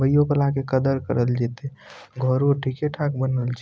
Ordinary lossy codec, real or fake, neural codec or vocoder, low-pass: none; real; none; none